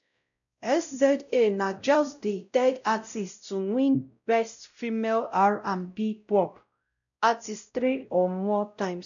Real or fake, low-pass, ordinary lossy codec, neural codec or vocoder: fake; 7.2 kHz; none; codec, 16 kHz, 0.5 kbps, X-Codec, WavLM features, trained on Multilingual LibriSpeech